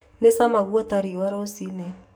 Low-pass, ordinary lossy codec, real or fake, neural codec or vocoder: none; none; fake; codec, 44.1 kHz, 7.8 kbps, DAC